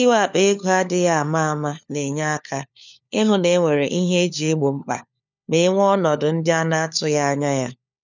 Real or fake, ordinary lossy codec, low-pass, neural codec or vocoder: fake; none; 7.2 kHz; codec, 16 kHz, 4 kbps, FunCodec, trained on LibriTTS, 50 frames a second